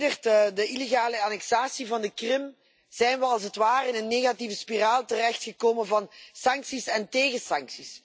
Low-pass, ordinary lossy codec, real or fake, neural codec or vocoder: none; none; real; none